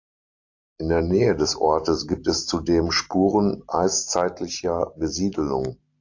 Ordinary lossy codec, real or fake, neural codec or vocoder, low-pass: AAC, 48 kbps; real; none; 7.2 kHz